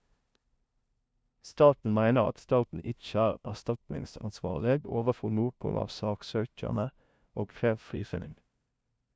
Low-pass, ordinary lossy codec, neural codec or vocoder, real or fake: none; none; codec, 16 kHz, 0.5 kbps, FunCodec, trained on LibriTTS, 25 frames a second; fake